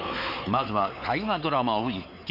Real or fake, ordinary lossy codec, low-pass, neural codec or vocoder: fake; Opus, 64 kbps; 5.4 kHz; codec, 16 kHz, 4 kbps, X-Codec, WavLM features, trained on Multilingual LibriSpeech